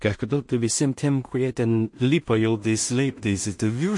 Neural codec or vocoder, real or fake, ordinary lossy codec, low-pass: codec, 16 kHz in and 24 kHz out, 0.4 kbps, LongCat-Audio-Codec, two codebook decoder; fake; MP3, 48 kbps; 10.8 kHz